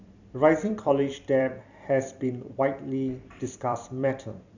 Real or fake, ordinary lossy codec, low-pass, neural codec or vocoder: fake; none; 7.2 kHz; vocoder, 44.1 kHz, 128 mel bands every 256 samples, BigVGAN v2